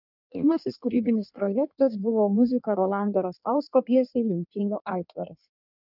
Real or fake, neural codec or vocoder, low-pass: fake; codec, 16 kHz in and 24 kHz out, 1.1 kbps, FireRedTTS-2 codec; 5.4 kHz